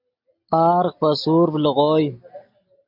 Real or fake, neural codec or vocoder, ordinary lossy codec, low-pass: real; none; AAC, 48 kbps; 5.4 kHz